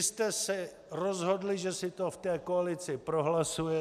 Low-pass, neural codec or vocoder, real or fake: 14.4 kHz; none; real